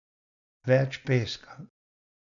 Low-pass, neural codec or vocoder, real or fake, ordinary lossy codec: 7.2 kHz; none; real; none